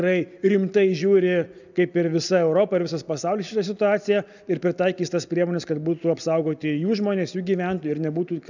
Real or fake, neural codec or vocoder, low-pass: real; none; 7.2 kHz